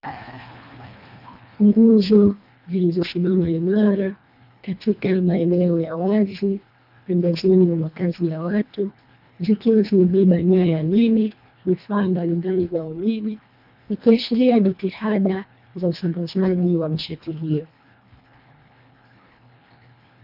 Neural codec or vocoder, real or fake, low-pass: codec, 24 kHz, 1.5 kbps, HILCodec; fake; 5.4 kHz